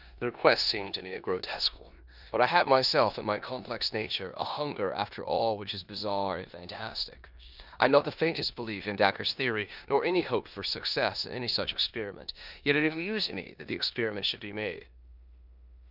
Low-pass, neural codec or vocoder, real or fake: 5.4 kHz; codec, 16 kHz in and 24 kHz out, 0.9 kbps, LongCat-Audio-Codec, four codebook decoder; fake